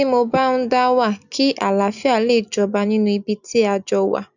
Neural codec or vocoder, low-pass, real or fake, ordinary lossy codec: none; 7.2 kHz; real; none